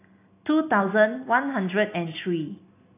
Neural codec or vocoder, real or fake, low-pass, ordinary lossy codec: none; real; 3.6 kHz; AAC, 24 kbps